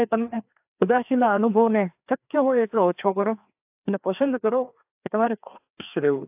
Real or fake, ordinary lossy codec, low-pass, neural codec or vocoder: fake; AAC, 32 kbps; 3.6 kHz; codec, 16 kHz, 2 kbps, FreqCodec, larger model